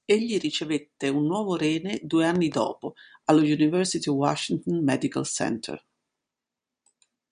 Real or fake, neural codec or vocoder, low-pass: real; none; 9.9 kHz